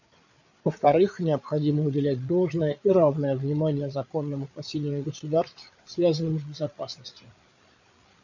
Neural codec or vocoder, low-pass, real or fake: codec, 16 kHz, 8 kbps, FreqCodec, larger model; 7.2 kHz; fake